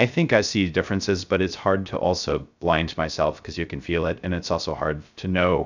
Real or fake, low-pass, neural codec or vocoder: fake; 7.2 kHz; codec, 16 kHz, 0.3 kbps, FocalCodec